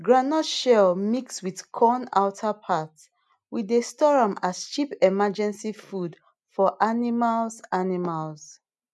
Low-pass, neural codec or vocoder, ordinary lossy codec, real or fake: none; none; none; real